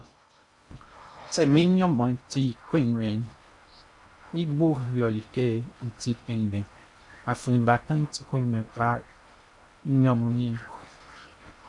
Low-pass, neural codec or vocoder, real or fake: 10.8 kHz; codec, 16 kHz in and 24 kHz out, 0.6 kbps, FocalCodec, streaming, 4096 codes; fake